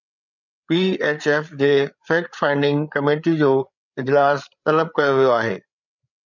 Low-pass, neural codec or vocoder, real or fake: 7.2 kHz; codec, 16 kHz, 8 kbps, FreqCodec, larger model; fake